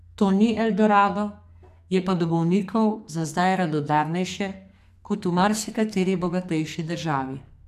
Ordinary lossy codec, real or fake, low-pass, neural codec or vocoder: AAC, 96 kbps; fake; 14.4 kHz; codec, 44.1 kHz, 2.6 kbps, SNAC